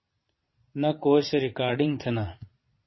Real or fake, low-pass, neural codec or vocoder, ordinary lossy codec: fake; 7.2 kHz; vocoder, 22.05 kHz, 80 mel bands, Vocos; MP3, 24 kbps